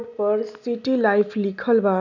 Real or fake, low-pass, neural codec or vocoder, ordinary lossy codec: real; 7.2 kHz; none; none